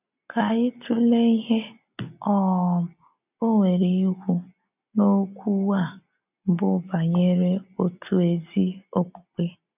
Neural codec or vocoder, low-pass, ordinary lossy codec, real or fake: none; 3.6 kHz; none; real